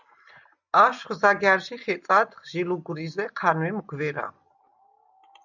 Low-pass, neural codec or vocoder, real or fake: 7.2 kHz; none; real